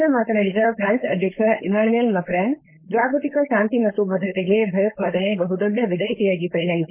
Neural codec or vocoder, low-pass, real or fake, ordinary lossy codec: codec, 16 kHz, 4.8 kbps, FACodec; 3.6 kHz; fake; MP3, 24 kbps